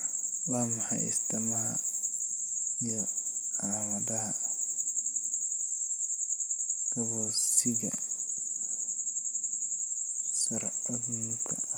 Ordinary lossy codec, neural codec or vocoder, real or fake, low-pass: none; none; real; none